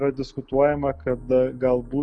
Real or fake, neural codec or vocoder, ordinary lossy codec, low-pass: real; none; Opus, 64 kbps; 9.9 kHz